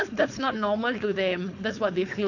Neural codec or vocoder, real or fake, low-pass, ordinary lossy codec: codec, 16 kHz, 4.8 kbps, FACodec; fake; 7.2 kHz; none